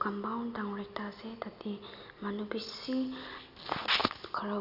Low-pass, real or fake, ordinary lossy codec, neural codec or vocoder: 5.4 kHz; real; none; none